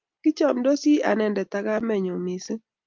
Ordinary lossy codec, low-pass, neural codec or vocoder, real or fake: Opus, 24 kbps; 7.2 kHz; none; real